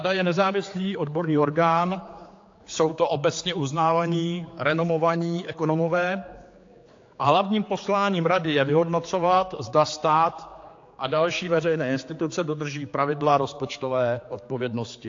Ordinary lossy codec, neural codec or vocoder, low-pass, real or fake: AAC, 48 kbps; codec, 16 kHz, 4 kbps, X-Codec, HuBERT features, trained on general audio; 7.2 kHz; fake